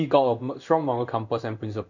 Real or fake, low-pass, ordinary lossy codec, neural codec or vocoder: fake; 7.2 kHz; none; codec, 16 kHz in and 24 kHz out, 1 kbps, XY-Tokenizer